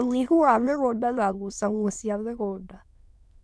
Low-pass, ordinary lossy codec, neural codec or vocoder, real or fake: none; none; autoencoder, 22.05 kHz, a latent of 192 numbers a frame, VITS, trained on many speakers; fake